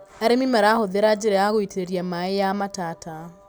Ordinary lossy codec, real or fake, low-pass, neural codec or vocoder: none; real; none; none